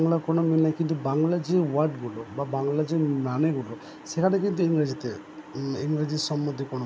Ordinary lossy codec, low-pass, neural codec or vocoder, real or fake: none; none; none; real